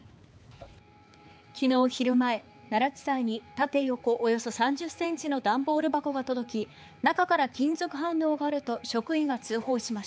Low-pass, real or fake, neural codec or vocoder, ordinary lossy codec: none; fake; codec, 16 kHz, 4 kbps, X-Codec, HuBERT features, trained on balanced general audio; none